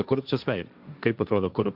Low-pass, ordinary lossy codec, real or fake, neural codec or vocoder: 5.4 kHz; Opus, 64 kbps; fake; codec, 16 kHz, 1.1 kbps, Voila-Tokenizer